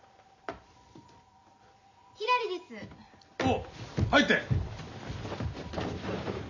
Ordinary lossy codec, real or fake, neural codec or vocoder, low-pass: none; real; none; 7.2 kHz